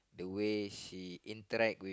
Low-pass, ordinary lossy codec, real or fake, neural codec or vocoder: none; none; real; none